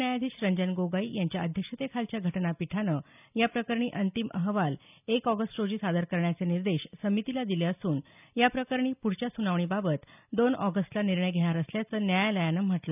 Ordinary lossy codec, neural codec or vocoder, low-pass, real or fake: none; none; 3.6 kHz; real